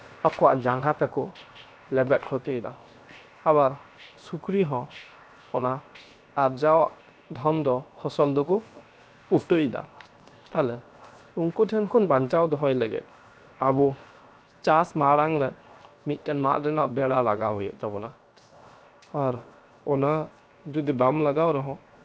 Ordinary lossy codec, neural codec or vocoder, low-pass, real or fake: none; codec, 16 kHz, 0.7 kbps, FocalCodec; none; fake